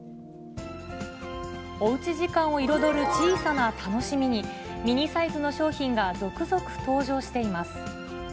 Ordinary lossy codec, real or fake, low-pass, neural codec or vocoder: none; real; none; none